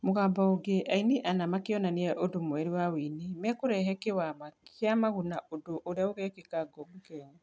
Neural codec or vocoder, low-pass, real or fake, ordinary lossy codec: none; none; real; none